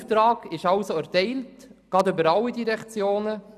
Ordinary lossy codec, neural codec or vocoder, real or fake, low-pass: none; none; real; 14.4 kHz